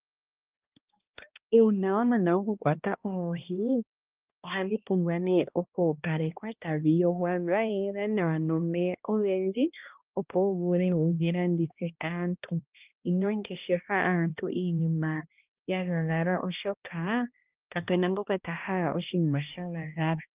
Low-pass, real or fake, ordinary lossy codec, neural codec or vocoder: 3.6 kHz; fake; Opus, 32 kbps; codec, 16 kHz, 1 kbps, X-Codec, HuBERT features, trained on balanced general audio